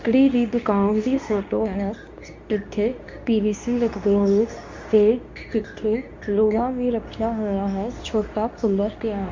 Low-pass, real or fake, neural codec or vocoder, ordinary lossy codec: 7.2 kHz; fake; codec, 24 kHz, 0.9 kbps, WavTokenizer, medium speech release version 2; MP3, 48 kbps